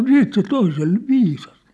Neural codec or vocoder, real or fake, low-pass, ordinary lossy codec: none; real; none; none